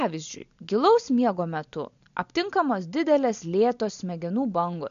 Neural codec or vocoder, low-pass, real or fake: none; 7.2 kHz; real